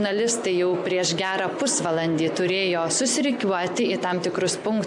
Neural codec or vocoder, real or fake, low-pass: none; real; 10.8 kHz